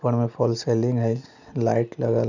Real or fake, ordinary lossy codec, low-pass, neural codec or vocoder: real; none; 7.2 kHz; none